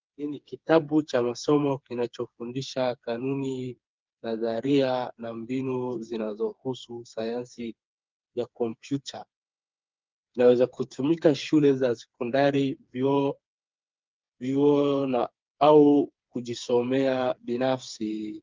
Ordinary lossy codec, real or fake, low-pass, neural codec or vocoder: Opus, 32 kbps; fake; 7.2 kHz; codec, 16 kHz, 4 kbps, FreqCodec, smaller model